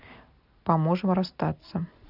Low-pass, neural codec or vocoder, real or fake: 5.4 kHz; none; real